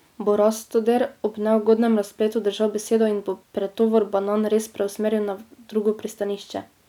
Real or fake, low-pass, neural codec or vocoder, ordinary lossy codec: real; 19.8 kHz; none; none